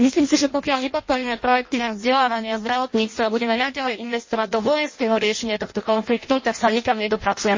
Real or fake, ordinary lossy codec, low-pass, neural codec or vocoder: fake; MP3, 32 kbps; 7.2 kHz; codec, 16 kHz in and 24 kHz out, 0.6 kbps, FireRedTTS-2 codec